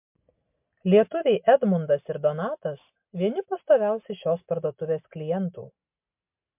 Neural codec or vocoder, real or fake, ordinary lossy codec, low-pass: none; real; MP3, 32 kbps; 3.6 kHz